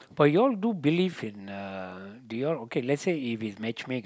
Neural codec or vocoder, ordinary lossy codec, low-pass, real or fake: none; none; none; real